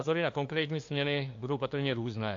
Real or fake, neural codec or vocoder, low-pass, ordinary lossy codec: fake; codec, 16 kHz, 2 kbps, FunCodec, trained on LibriTTS, 25 frames a second; 7.2 kHz; AAC, 48 kbps